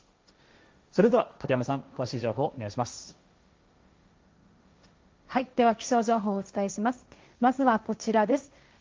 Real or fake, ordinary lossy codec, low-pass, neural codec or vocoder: fake; Opus, 32 kbps; 7.2 kHz; codec, 16 kHz, 1.1 kbps, Voila-Tokenizer